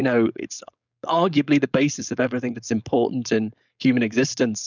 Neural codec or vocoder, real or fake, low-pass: codec, 16 kHz, 4.8 kbps, FACodec; fake; 7.2 kHz